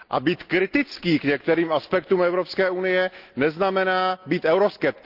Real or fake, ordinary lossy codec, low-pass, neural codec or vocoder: real; Opus, 32 kbps; 5.4 kHz; none